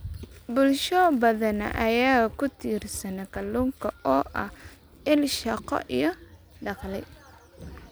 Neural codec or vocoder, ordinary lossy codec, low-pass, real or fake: none; none; none; real